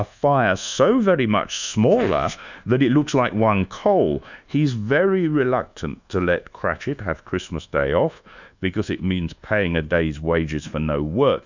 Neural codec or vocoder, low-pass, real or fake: codec, 24 kHz, 1.2 kbps, DualCodec; 7.2 kHz; fake